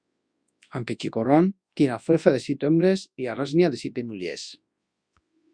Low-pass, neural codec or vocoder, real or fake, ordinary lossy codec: 9.9 kHz; codec, 24 kHz, 0.9 kbps, WavTokenizer, large speech release; fake; Opus, 64 kbps